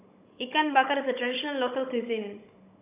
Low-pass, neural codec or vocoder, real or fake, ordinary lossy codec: 3.6 kHz; codec, 16 kHz, 16 kbps, FunCodec, trained on Chinese and English, 50 frames a second; fake; AAC, 32 kbps